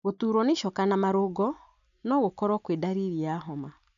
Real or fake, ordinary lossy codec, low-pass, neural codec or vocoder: real; none; 7.2 kHz; none